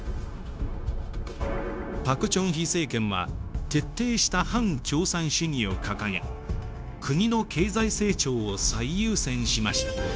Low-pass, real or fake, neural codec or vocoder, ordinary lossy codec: none; fake; codec, 16 kHz, 0.9 kbps, LongCat-Audio-Codec; none